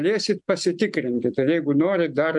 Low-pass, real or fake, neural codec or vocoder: 10.8 kHz; real; none